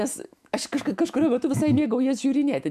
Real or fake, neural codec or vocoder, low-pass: fake; autoencoder, 48 kHz, 128 numbers a frame, DAC-VAE, trained on Japanese speech; 14.4 kHz